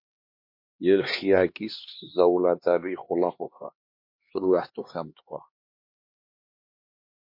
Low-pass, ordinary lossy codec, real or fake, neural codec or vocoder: 5.4 kHz; MP3, 32 kbps; fake; codec, 16 kHz, 2 kbps, X-Codec, HuBERT features, trained on LibriSpeech